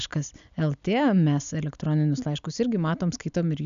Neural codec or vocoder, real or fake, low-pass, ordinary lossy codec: none; real; 7.2 kHz; AAC, 96 kbps